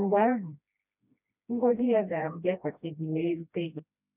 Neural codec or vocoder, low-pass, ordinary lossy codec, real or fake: codec, 16 kHz, 1 kbps, FreqCodec, smaller model; 3.6 kHz; none; fake